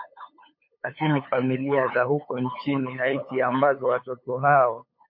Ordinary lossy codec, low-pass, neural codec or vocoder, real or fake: MP3, 32 kbps; 5.4 kHz; codec, 16 kHz, 8 kbps, FunCodec, trained on LibriTTS, 25 frames a second; fake